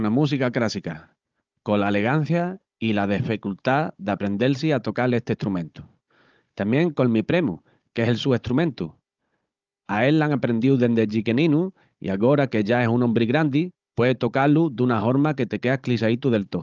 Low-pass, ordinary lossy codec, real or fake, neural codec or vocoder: 7.2 kHz; Opus, 24 kbps; fake; codec, 16 kHz, 16 kbps, FunCodec, trained on Chinese and English, 50 frames a second